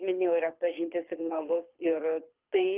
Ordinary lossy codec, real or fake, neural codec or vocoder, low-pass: Opus, 24 kbps; fake; codec, 24 kHz, 6 kbps, HILCodec; 3.6 kHz